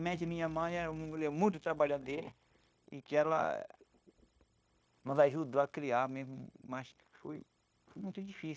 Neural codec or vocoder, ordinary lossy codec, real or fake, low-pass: codec, 16 kHz, 0.9 kbps, LongCat-Audio-Codec; none; fake; none